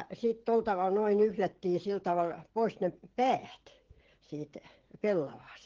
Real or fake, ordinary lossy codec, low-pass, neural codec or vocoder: fake; Opus, 16 kbps; 7.2 kHz; codec, 16 kHz, 16 kbps, FreqCodec, smaller model